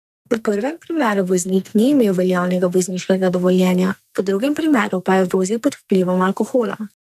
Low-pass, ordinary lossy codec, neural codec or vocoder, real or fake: 14.4 kHz; none; codec, 44.1 kHz, 2.6 kbps, SNAC; fake